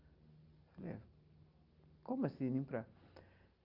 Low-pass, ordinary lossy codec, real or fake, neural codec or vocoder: 5.4 kHz; Opus, 32 kbps; real; none